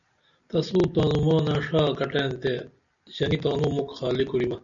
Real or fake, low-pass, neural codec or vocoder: real; 7.2 kHz; none